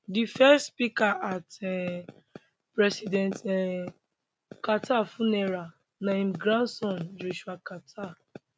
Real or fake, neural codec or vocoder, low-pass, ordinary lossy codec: real; none; none; none